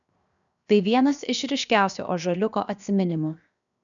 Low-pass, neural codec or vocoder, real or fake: 7.2 kHz; codec, 16 kHz, 0.7 kbps, FocalCodec; fake